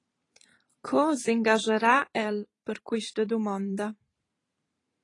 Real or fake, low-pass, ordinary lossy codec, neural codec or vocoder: fake; 10.8 kHz; AAC, 32 kbps; vocoder, 44.1 kHz, 128 mel bands every 512 samples, BigVGAN v2